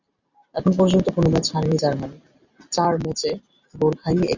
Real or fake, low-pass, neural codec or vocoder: real; 7.2 kHz; none